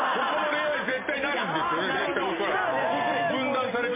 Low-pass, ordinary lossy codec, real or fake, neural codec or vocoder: 3.6 kHz; MP3, 16 kbps; real; none